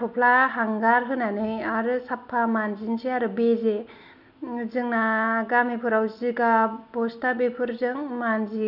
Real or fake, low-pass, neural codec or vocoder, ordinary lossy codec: real; 5.4 kHz; none; none